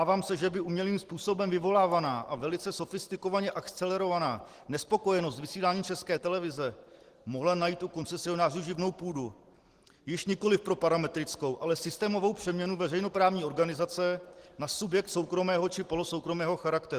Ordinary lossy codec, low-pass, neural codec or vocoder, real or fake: Opus, 16 kbps; 14.4 kHz; none; real